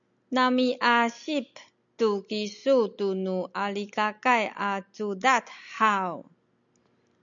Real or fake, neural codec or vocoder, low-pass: real; none; 7.2 kHz